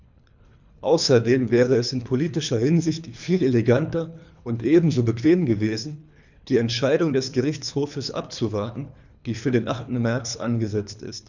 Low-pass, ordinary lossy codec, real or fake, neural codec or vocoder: 7.2 kHz; none; fake; codec, 24 kHz, 3 kbps, HILCodec